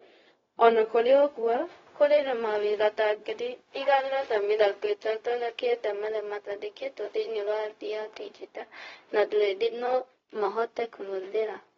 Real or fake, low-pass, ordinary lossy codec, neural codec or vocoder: fake; 7.2 kHz; AAC, 24 kbps; codec, 16 kHz, 0.4 kbps, LongCat-Audio-Codec